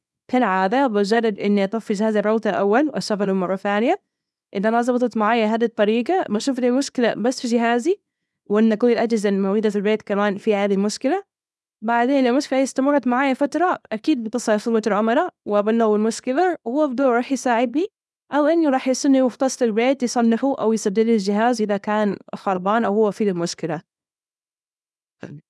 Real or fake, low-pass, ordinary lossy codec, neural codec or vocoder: fake; none; none; codec, 24 kHz, 0.9 kbps, WavTokenizer, small release